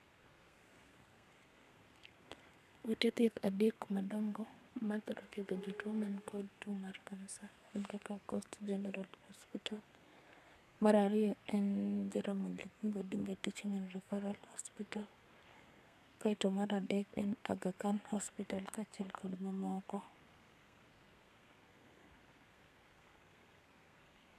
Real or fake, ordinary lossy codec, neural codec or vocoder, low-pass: fake; none; codec, 32 kHz, 1.9 kbps, SNAC; 14.4 kHz